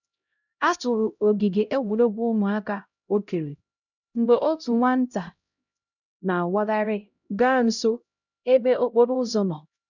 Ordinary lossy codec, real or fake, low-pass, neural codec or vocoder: none; fake; 7.2 kHz; codec, 16 kHz, 0.5 kbps, X-Codec, HuBERT features, trained on LibriSpeech